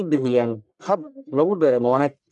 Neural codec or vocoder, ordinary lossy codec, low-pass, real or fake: codec, 44.1 kHz, 1.7 kbps, Pupu-Codec; none; 10.8 kHz; fake